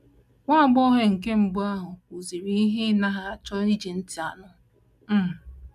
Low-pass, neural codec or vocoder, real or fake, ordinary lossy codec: 14.4 kHz; none; real; none